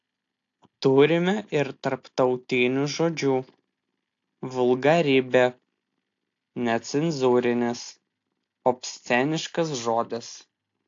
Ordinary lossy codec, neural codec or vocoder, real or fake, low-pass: AAC, 48 kbps; none; real; 7.2 kHz